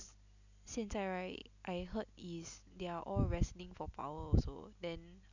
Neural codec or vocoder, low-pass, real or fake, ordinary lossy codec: none; 7.2 kHz; real; none